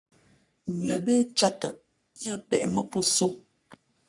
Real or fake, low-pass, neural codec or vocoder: fake; 10.8 kHz; codec, 44.1 kHz, 3.4 kbps, Pupu-Codec